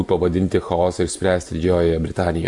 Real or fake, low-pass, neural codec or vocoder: real; 10.8 kHz; none